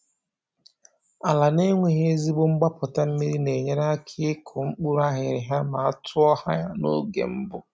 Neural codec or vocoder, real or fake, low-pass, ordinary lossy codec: none; real; none; none